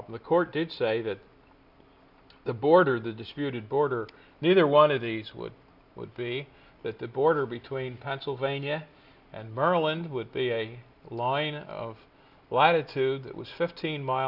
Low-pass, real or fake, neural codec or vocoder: 5.4 kHz; real; none